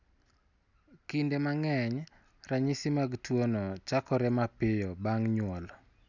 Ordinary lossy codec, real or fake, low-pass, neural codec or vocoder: none; real; 7.2 kHz; none